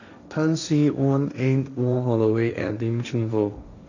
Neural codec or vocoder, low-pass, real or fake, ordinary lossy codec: codec, 16 kHz, 1.1 kbps, Voila-Tokenizer; 7.2 kHz; fake; none